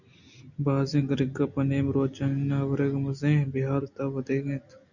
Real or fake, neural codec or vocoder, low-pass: real; none; 7.2 kHz